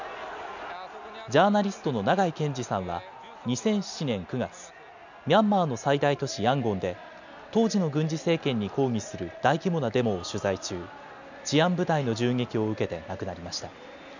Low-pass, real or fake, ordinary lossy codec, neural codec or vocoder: 7.2 kHz; real; none; none